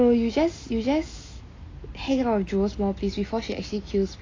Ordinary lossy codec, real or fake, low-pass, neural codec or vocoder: AAC, 32 kbps; real; 7.2 kHz; none